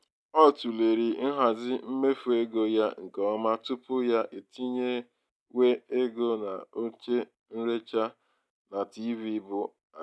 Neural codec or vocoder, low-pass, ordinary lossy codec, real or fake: none; none; none; real